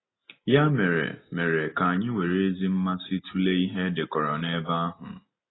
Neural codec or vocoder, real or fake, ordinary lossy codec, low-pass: none; real; AAC, 16 kbps; 7.2 kHz